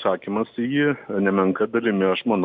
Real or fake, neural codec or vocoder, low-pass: real; none; 7.2 kHz